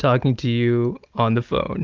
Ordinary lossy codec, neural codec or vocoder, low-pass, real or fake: Opus, 32 kbps; none; 7.2 kHz; real